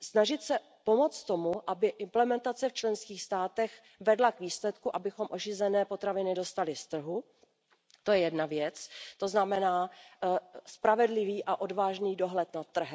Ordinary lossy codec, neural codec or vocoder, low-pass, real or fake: none; none; none; real